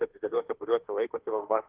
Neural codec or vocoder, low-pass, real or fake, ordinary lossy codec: autoencoder, 48 kHz, 32 numbers a frame, DAC-VAE, trained on Japanese speech; 3.6 kHz; fake; Opus, 16 kbps